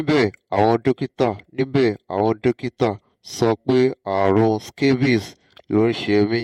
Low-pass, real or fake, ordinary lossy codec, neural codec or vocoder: 19.8 kHz; fake; MP3, 64 kbps; vocoder, 48 kHz, 128 mel bands, Vocos